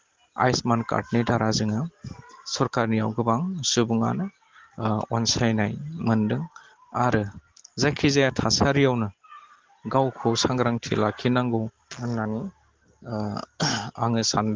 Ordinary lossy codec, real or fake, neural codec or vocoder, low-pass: Opus, 16 kbps; real; none; 7.2 kHz